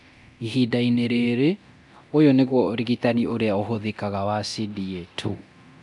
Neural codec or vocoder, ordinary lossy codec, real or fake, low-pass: codec, 24 kHz, 0.9 kbps, DualCodec; none; fake; 10.8 kHz